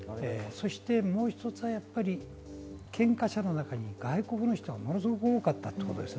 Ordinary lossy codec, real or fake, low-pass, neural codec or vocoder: none; real; none; none